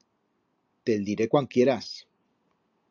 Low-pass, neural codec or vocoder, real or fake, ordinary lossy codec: 7.2 kHz; none; real; AAC, 48 kbps